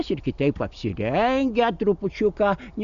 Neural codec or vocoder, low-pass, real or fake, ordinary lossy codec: none; 7.2 kHz; real; AAC, 96 kbps